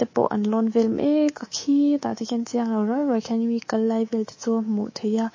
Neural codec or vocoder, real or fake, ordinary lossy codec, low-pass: codec, 24 kHz, 3.1 kbps, DualCodec; fake; MP3, 32 kbps; 7.2 kHz